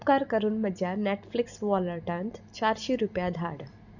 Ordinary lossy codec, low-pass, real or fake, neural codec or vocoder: none; 7.2 kHz; real; none